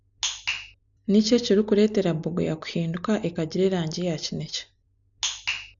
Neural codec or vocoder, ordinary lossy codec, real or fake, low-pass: none; none; real; 7.2 kHz